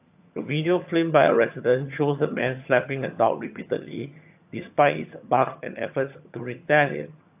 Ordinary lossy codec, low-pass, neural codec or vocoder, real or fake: AAC, 32 kbps; 3.6 kHz; vocoder, 22.05 kHz, 80 mel bands, HiFi-GAN; fake